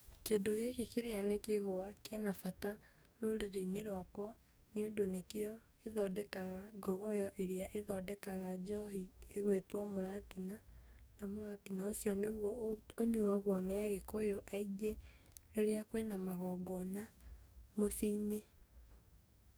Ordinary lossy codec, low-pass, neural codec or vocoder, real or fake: none; none; codec, 44.1 kHz, 2.6 kbps, DAC; fake